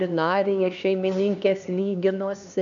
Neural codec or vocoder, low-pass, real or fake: codec, 16 kHz, 2 kbps, X-Codec, HuBERT features, trained on LibriSpeech; 7.2 kHz; fake